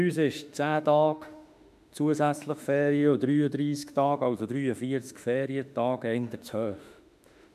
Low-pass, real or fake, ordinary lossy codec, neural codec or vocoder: 14.4 kHz; fake; none; autoencoder, 48 kHz, 32 numbers a frame, DAC-VAE, trained on Japanese speech